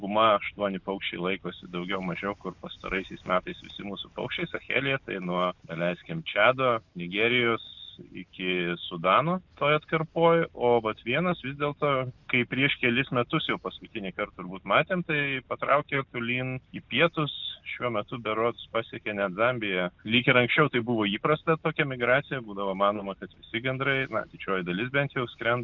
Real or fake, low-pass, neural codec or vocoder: real; 7.2 kHz; none